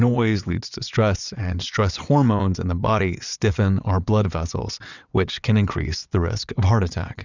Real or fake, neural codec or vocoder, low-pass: fake; vocoder, 22.05 kHz, 80 mel bands, WaveNeXt; 7.2 kHz